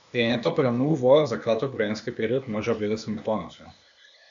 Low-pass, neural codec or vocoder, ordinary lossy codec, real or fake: 7.2 kHz; codec, 16 kHz, 0.8 kbps, ZipCodec; AAC, 64 kbps; fake